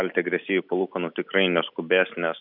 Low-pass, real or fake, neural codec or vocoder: 5.4 kHz; real; none